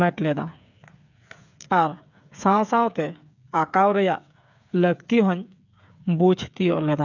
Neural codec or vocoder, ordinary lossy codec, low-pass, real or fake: codec, 16 kHz, 8 kbps, FreqCodec, smaller model; none; 7.2 kHz; fake